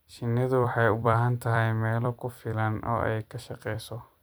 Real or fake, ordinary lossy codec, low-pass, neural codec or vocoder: fake; none; none; vocoder, 44.1 kHz, 128 mel bands every 256 samples, BigVGAN v2